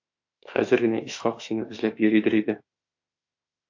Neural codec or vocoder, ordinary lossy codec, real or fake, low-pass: autoencoder, 48 kHz, 32 numbers a frame, DAC-VAE, trained on Japanese speech; AAC, 48 kbps; fake; 7.2 kHz